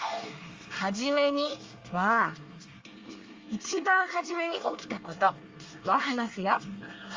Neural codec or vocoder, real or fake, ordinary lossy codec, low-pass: codec, 24 kHz, 1 kbps, SNAC; fake; Opus, 32 kbps; 7.2 kHz